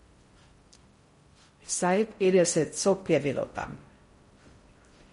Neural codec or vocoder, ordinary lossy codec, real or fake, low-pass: codec, 16 kHz in and 24 kHz out, 0.6 kbps, FocalCodec, streaming, 4096 codes; MP3, 48 kbps; fake; 10.8 kHz